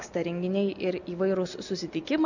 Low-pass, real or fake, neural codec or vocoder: 7.2 kHz; real; none